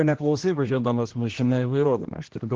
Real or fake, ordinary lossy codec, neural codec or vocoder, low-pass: fake; Opus, 16 kbps; codec, 16 kHz, 1 kbps, X-Codec, HuBERT features, trained on general audio; 7.2 kHz